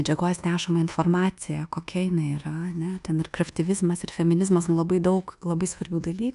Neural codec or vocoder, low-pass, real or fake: codec, 24 kHz, 1.2 kbps, DualCodec; 10.8 kHz; fake